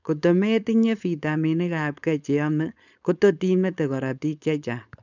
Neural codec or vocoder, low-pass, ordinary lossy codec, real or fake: codec, 16 kHz, 4.8 kbps, FACodec; 7.2 kHz; none; fake